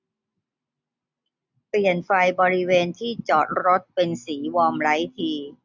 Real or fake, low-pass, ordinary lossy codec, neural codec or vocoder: real; 7.2 kHz; none; none